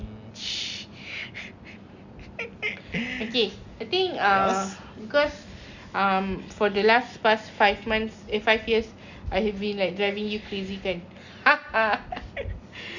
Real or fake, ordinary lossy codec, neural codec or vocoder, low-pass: real; none; none; 7.2 kHz